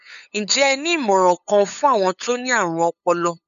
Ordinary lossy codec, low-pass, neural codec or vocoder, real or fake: none; 7.2 kHz; codec, 16 kHz, 8 kbps, FunCodec, trained on LibriTTS, 25 frames a second; fake